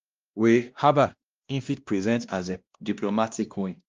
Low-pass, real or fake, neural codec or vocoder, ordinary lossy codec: 7.2 kHz; fake; codec, 16 kHz, 1 kbps, X-Codec, WavLM features, trained on Multilingual LibriSpeech; Opus, 32 kbps